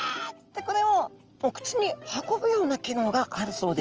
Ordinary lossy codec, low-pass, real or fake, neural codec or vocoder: Opus, 24 kbps; 7.2 kHz; real; none